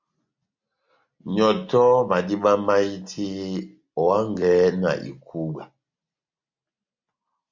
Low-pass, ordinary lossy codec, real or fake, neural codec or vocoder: 7.2 kHz; MP3, 64 kbps; real; none